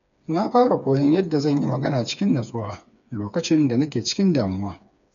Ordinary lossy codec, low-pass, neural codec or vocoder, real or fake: none; 7.2 kHz; codec, 16 kHz, 4 kbps, FreqCodec, smaller model; fake